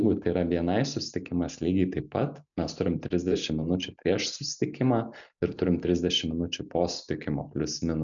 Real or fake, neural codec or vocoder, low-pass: real; none; 7.2 kHz